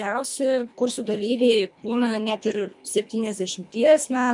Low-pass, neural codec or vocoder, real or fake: 10.8 kHz; codec, 24 kHz, 1.5 kbps, HILCodec; fake